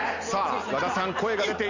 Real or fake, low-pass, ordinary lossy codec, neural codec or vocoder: real; 7.2 kHz; none; none